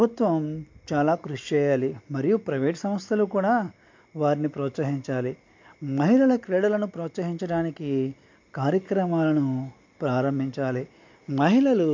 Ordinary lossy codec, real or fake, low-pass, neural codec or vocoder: MP3, 48 kbps; real; 7.2 kHz; none